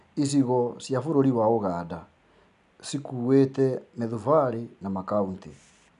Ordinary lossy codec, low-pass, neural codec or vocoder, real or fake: none; 10.8 kHz; none; real